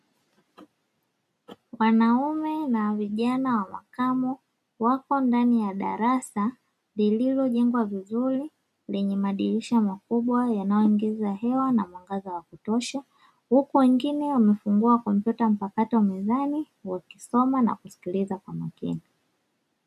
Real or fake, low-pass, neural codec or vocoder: real; 14.4 kHz; none